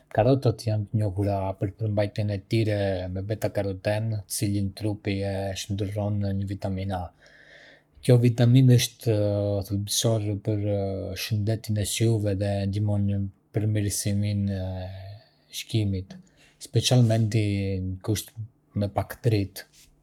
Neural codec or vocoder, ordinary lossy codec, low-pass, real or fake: codec, 44.1 kHz, 7.8 kbps, Pupu-Codec; none; 19.8 kHz; fake